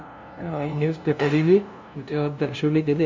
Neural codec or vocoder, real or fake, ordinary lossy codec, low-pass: codec, 16 kHz, 0.5 kbps, FunCodec, trained on LibriTTS, 25 frames a second; fake; MP3, 64 kbps; 7.2 kHz